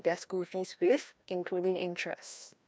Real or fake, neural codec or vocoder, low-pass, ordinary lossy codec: fake; codec, 16 kHz, 1 kbps, FreqCodec, larger model; none; none